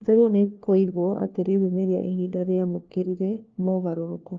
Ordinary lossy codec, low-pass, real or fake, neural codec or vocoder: Opus, 24 kbps; 7.2 kHz; fake; codec, 16 kHz, 1 kbps, FunCodec, trained on LibriTTS, 50 frames a second